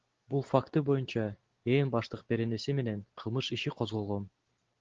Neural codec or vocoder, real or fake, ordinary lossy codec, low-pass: none; real; Opus, 16 kbps; 7.2 kHz